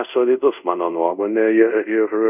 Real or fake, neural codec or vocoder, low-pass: fake; codec, 24 kHz, 0.5 kbps, DualCodec; 3.6 kHz